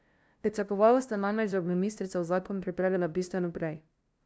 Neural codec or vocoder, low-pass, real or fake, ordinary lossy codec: codec, 16 kHz, 0.5 kbps, FunCodec, trained on LibriTTS, 25 frames a second; none; fake; none